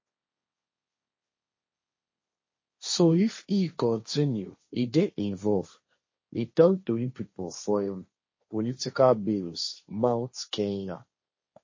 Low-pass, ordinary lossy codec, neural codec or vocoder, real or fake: 7.2 kHz; MP3, 32 kbps; codec, 16 kHz, 1.1 kbps, Voila-Tokenizer; fake